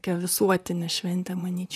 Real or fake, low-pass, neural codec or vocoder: fake; 14.4 kHz; vocoder, 44.1 kHz, 128 mel bands, Pupu-Vocoder